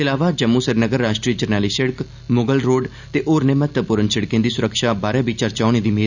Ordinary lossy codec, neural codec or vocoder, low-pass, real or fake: none; none; 7.2 kHz; real